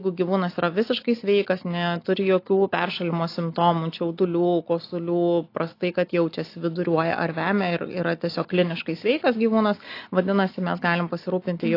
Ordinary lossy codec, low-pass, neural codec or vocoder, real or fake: AAC, 32 kbps; 5.4 kHz; none; real